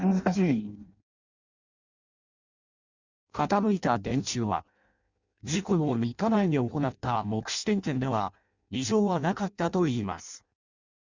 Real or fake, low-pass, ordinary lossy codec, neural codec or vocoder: fake; 7.2 kHz; Opus, 64 kbps; codec, 16 kHz in and 24 kHz out, 0.6 kbps, FireRedTTS-2 codec